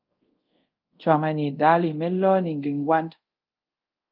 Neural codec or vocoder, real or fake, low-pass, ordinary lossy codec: codec, 24 kHz, 0.5 kbps, DualCodec; fake; 5.4 kHz; Opus, 24 kbps